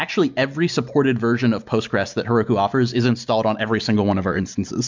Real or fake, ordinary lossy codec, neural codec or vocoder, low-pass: real; MP3, 64 kbps; none; 7.2 kHz